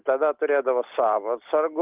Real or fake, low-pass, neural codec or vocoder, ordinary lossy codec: real; 3.6 kHz; none; Opus, 32 kbps